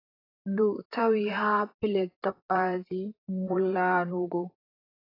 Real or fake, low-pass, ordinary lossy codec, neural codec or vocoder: fake; 5.4 kHz; AAC, 24 kbps; vocoder, 44.1 kHz, 128 mel bands, Pupu-Vocoder